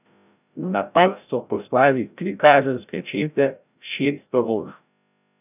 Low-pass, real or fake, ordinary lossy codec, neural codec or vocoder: 3.6 kHz; fake; none; codec, 16 kHz, 0.5 kbps, FreqCodec, larger model